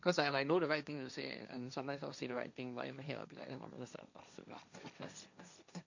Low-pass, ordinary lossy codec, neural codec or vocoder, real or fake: 7.2 kHz; none; codec, 16 kHz, 1.1 kbps, Voila-Tokenizer; fake